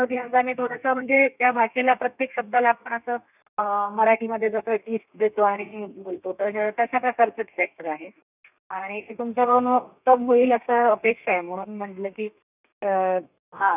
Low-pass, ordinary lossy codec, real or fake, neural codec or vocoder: 3.6 kHz; none; fake; codec, 32 kHz, 1.9 kbps, SNAC